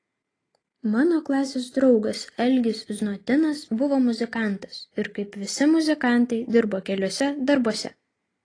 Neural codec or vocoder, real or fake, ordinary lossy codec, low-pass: none; real; AAC, 32 kbps; 9.9 kHz